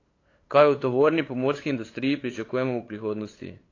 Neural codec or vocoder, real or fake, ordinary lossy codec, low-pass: codec, 16 kHz, 8 kbps, FunCodec, trained on LibriTTS, 25 frames a second; fake; AAC, 32 kbps; 7.2 kHz